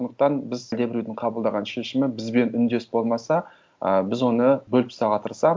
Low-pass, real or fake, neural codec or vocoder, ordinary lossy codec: none; real; none; none